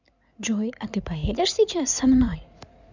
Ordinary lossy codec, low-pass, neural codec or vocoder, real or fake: none; 7.2 kHz; codec, 16 kHz in and 24 kHz out, 2.2 kbps, FireRedTTS-2 codec; fake